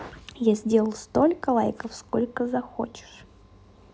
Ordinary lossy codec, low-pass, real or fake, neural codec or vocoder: none; none; real; none